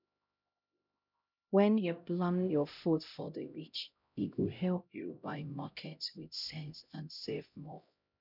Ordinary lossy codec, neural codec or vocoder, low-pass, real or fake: none; codec, 16 kHz, 0.5 kbps, X-Codec, HuBERT features, trained on LibriSpeech; 5.4 kHz; fake